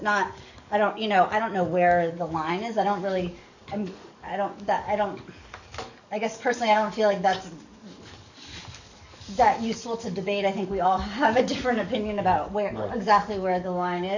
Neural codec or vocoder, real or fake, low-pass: codec, 44.1 kHz, 7.8 kbps, DAC; fake; 7.2 kHz